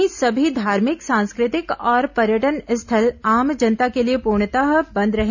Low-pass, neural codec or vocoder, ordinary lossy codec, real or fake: 7.2 kHz; none; none; real